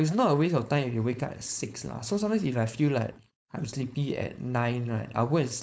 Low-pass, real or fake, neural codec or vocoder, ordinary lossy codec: none; fake; codec, 16 kHz, 4.8 kbps, FACodec; none